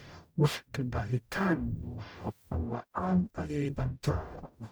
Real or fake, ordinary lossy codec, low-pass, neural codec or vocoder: fake; none; none; codec, 44.1 kHz, 0.9 kbps, DAC